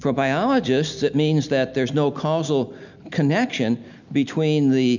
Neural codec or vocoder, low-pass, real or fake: none; 7.2 kHz; real